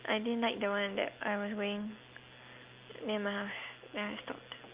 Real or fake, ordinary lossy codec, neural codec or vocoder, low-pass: real; Opus, 24 kbps; none; 3.6 kHz